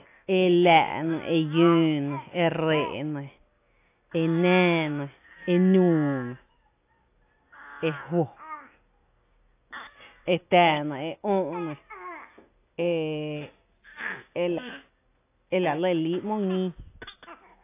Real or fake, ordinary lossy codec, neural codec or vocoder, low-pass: real; AAC, 24 kbps; none; 3.6 kHz